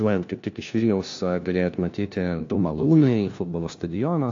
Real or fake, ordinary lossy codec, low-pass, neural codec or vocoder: fake; AAC, 48 kbps; 7.2 kHz; codec, 16 kHz, 1 kbps, FunCodec, trained on LibriTTS, 50 frames a second